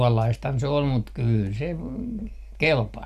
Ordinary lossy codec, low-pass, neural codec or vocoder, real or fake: none; 14.4 kHz; none; real